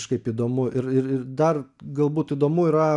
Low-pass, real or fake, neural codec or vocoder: 10.8 kHz; real; none